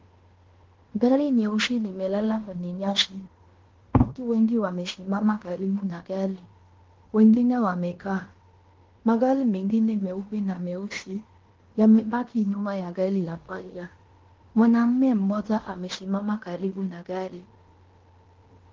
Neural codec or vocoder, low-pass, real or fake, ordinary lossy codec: codec, 16 kHz in and 24 kHz out, 0.9 kbps, LongCat-Audio-Codec, fine tuned four codebook decoder; 7.2 kHz; fake; Opus, 16 kbps